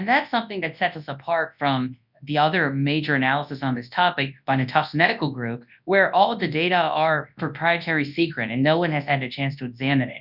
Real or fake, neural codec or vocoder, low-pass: fake; codec, 24 kHz, 0.9 kbps, WavTokenizer, large speech release; 5.4 kHz